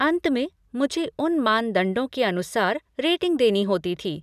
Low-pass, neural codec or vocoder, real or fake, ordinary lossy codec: 14.4 kHz; none; real; none